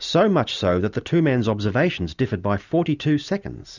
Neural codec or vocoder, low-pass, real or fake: none; 7.2 kHz; real